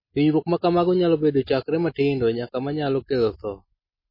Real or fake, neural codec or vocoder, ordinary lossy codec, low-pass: real; none; MP3, 24 kbps; 5.4 kHz